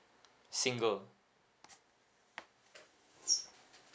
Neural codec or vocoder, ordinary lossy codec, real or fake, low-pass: none; none; real; none